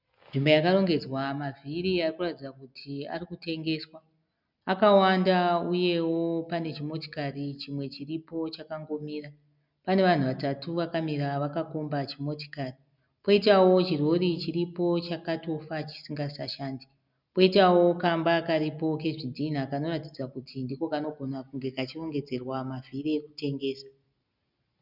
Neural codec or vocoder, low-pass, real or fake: none; 5.4 kHz; real